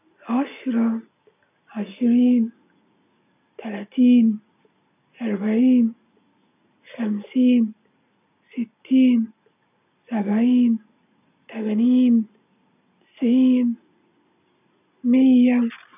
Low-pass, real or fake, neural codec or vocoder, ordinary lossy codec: 3.6 kHz; real; none; none